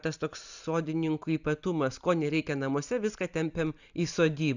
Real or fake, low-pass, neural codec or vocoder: real; 7.2 kHz; none